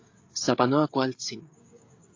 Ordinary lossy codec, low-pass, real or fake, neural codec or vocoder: AAC, 48 kbps; 7.2 kHz; fake; codec, 16 kHz, 16 kbps, FreqCodec, smaller model